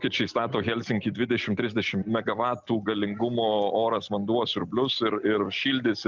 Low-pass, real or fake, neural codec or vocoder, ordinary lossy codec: 7.2 kHz; real; none; Opus, 24 kbps